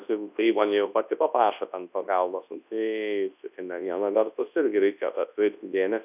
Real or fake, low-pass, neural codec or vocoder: fake; 3.6 kHz; codec, 24 kHz, 0.9 kbps, WavTokenizer, large speech release